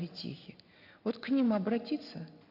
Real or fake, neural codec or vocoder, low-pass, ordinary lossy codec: real; none; 5.4 kHz; AAC, 48 kbps